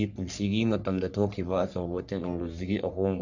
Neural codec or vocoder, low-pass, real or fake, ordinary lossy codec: codec, 44.1 kHz, 3.4 kbps, Pupu-Codec; 7.2 kHz; fake; none